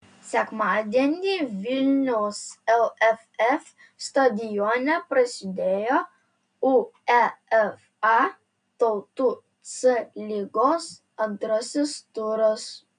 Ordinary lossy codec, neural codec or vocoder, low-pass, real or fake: AAC, 96 kbps; none; 9.9 kHz; real